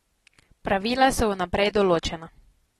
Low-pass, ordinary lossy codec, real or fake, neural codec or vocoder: 19.8 kHz; AAC, 32 kbps; real; none